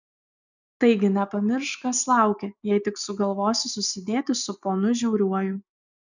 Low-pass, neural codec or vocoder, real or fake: 7.2 kHz; none; real